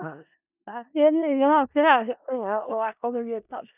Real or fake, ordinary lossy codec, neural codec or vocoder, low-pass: fake; none; codec, 16 kHz in and 24 kHz out, 0.4 kbps, LongCat-Audio-Codec, four codebook decoder; 3.6 kHz